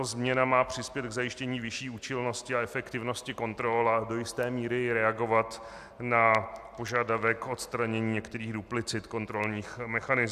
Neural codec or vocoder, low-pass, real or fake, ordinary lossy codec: none; 14.4 kHz; real; AAC, 96 kbps